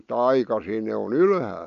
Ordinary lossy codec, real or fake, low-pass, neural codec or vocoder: none; real; 7.2 kHz; none